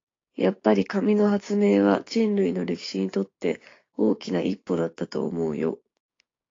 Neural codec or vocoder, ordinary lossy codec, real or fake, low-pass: codec, 16 kHz, 6 kbps, DAC; AAC, 32 kbps; fake; 7.2 kHz